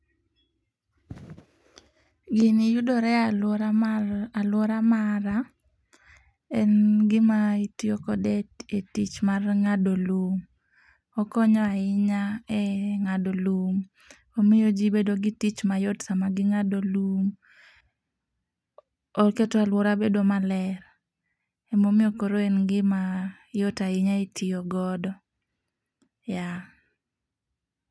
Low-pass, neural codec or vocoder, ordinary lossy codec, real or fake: none; none; none; real